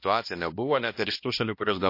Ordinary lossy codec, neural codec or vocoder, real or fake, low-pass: MP3, 32 kbps; codec, 16 kHz, 1 kbps, X-Codec, HuBERT features, trained on balanced general audio; fake; 5.4 kHz